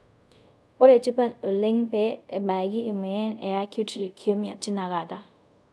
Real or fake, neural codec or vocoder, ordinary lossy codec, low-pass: fake; codec, 24 kHz, 0.5 kbps, DualCodec; none; none